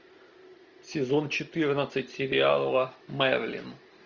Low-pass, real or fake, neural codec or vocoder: 7.2 kHz; real; none